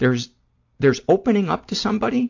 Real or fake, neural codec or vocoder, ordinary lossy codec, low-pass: real; none; AAC, 48 kbps; 7.2 kHz